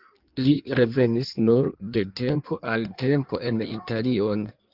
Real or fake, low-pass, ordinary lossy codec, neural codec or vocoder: fake; 5.4 kHz; Opus, 32 kbps; codec, 16 kHz in and 24 kHz out, 1.1 kbps, FireRedTTS-2 codec